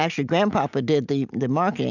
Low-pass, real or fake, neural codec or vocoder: 7.2 kHz; real; none